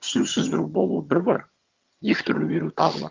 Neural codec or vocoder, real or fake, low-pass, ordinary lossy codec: vocoder, 22.05 kHz, 80 mel bands, HiFi-GAN; fake; 7.2 kHz; Opus, 32 kbps